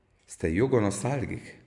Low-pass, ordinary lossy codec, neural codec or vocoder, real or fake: 10.8 kHz; MP3, 96 kbps; none; real